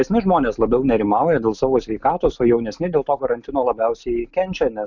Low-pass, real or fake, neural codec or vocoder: 7.2 kHz; real; none